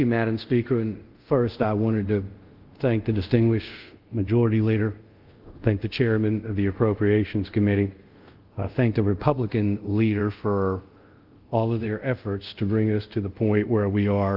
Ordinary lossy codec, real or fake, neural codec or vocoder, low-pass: Opus, 32 kbps; fake; codec, 24 kHz, 0.5 kbps, DualCodec; 5.4 kHz